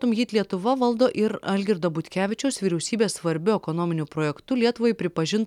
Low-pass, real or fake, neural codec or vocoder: 19.8 kHz; real; none